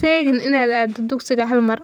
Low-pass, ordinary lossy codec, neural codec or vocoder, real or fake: none; none; vocoder, 44.1 kHz, 128 mel bands, Pupu-Vocoder; fake